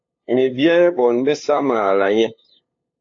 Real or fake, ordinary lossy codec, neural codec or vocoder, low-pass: fake; AAC, 48 kbps; codec, 16 kHz, 2 kbps, FunCodec, trained on LibriTTS, 25 frames a second; 7.2 kHz